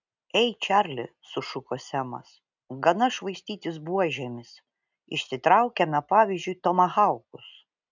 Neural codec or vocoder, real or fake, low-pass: none; real; 7.2 kHz